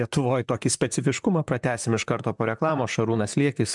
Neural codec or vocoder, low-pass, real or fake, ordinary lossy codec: vocoder, 44.1 kHz, 128 mel bands, Pupu-Vocoder; 10.8 kHz; fake; MP3, 96 kbps